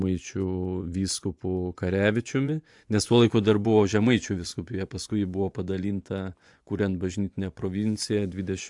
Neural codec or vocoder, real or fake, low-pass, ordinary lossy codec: vocoder, 24 kHz, 100 mel bands, Vocos; fake; 10.8 kHz; AAC, 64 kbps